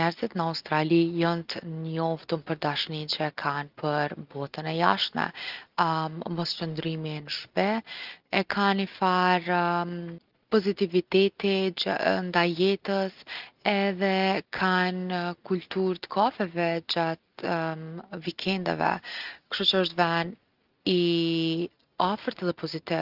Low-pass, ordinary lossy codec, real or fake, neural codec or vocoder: 5.4 kHz; Opus, 24 kbps; real; none